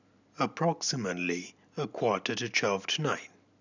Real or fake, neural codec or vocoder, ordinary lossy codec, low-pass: real; none; none; 7.2 kHz